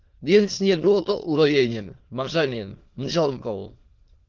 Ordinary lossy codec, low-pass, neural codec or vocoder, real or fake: Opus, 16 kbps; 7.2 kHz; autoencoder, 22.05 kHz, a latent of 192 numbers a frame, VITS, trained on many speakers; fake